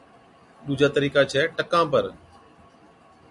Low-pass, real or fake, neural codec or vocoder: 10.8 kHz; real; none